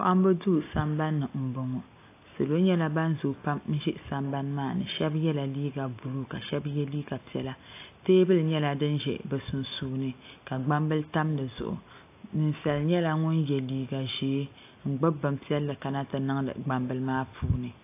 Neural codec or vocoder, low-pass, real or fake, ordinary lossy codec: none; 3.6 kHz; real; AAC, 24 kbps